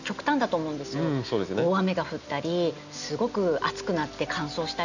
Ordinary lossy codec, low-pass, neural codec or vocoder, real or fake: none; 7.2 kHz; none; real